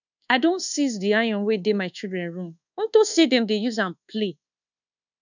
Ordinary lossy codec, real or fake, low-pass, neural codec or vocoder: none; fake; 7.2 kHz; codec, 24 kHz, 1.2 kbps, DualCodec